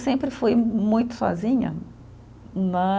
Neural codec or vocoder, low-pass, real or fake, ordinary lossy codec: none; none; real; none